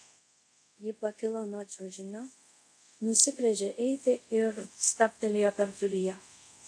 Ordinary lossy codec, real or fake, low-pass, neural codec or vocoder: AAC, 64 kbps; fake; 9.9 kHz; codec, 24 kHz, 0.5 kbps, DualCodec